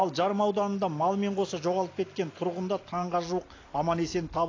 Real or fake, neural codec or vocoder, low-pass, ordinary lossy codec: real; none; 7.2 kHz; AAC, 32 kbps